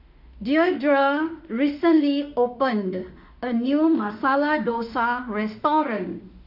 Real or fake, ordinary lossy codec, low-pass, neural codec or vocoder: fake; none; 5.4 kHz; autoencoder, 48 kHz, 32 numbers a frame, DAC-VAE, trained on Japanese speech